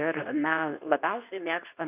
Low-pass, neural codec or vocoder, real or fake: 3.6 kHz; codec, 24 kHz, 0.9 kbps, WavTokenizer, medium speech release version 2; fake